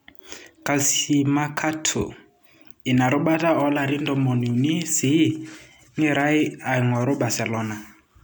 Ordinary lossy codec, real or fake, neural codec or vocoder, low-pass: none; real; none; none